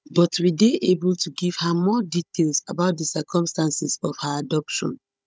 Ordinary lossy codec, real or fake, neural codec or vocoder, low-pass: none; fake; codec, 16 kHz, 16 kbps, FunCodec, trained on Chinese and English, 50 frames a second; none